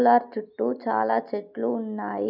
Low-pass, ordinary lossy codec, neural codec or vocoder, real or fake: 5.4 kHz; none; none; real